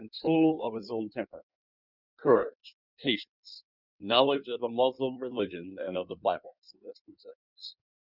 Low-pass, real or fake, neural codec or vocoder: 5.4 kHz; fake; codec, 16 kHz in and 24 kHz out, 1.1 kbps, FireRedTTS-2 codec